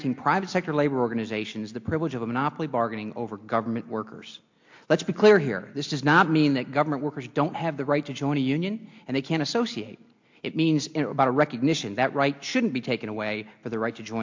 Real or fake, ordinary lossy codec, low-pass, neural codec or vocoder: real; MP3, 48 kbps; 7.2 kHz; none